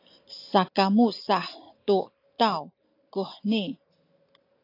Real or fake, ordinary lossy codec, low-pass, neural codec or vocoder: real; AAC, 32 kbps; 5.4 kHz; none